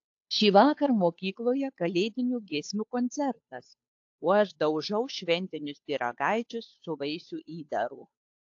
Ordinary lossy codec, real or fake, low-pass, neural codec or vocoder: AAC, 48 kbps; fake; 7.2 kHz; codec, 16 kHz, 2 kbps, FunCodec, trained on Chinese and English, 25 frames a second